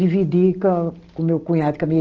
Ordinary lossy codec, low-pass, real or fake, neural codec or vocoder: Opus, 16 kbps; 7.2 kHz; real; none